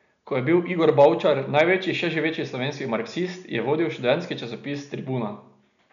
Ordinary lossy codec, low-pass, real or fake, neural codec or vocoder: none; 7.2 kHz; real; none